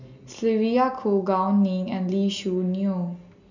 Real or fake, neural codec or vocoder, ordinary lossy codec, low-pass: real; none; none; 7.2 kHz